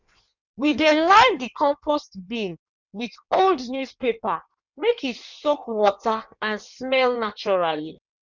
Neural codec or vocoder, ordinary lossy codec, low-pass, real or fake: codec, 16 kHz in and 24 kHz out, 1.1 kbps, FireRedTTS-2 codec; none; 7.2 kHz; fake